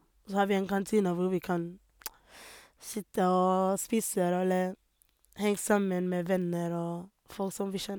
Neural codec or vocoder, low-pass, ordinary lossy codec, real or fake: none; none; none; real